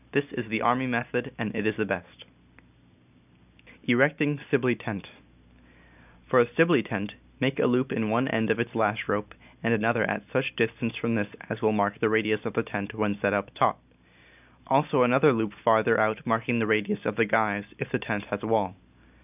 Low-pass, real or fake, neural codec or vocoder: 3.6 kHz; real; none